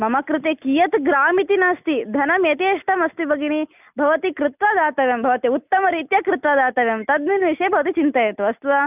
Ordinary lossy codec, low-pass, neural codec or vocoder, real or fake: none; 3.6 kHz; none; real